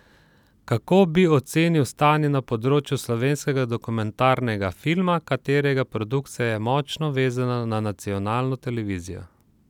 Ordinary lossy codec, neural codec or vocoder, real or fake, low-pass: none; none; real; 19.8 kHz